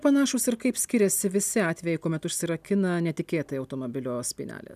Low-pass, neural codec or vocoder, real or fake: 14.4 kHz; none; real